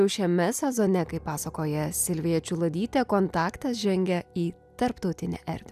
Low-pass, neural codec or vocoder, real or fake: 14.4 kHz; none; real